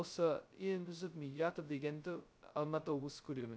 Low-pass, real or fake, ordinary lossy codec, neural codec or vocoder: none; fake; none; codec, 16 kHz, 0.2 kbps, FocalCodec